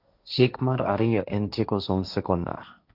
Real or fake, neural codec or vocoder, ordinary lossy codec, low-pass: fake; codec, 16 kHz, 1.1 kbps, Voila-Tokenizer; none; 5.4 kHz